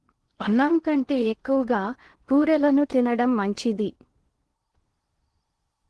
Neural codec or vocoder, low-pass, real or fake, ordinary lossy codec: codec, 16 kHz in and 24 kHz out, 0.8 kbps, FocalCodec, streaming, 65536 codes; 10.8 kHz; fake; Opus, 16 kbps